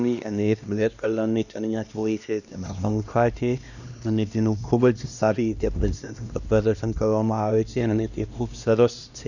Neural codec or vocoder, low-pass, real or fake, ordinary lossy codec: codec, 16 kHz, 1 kbps, X-Codec, HuBERT features, trained on LibriSpeech; 7.2 kHz; fake; none